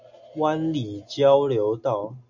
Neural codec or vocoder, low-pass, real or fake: none; 7.2 kHz; real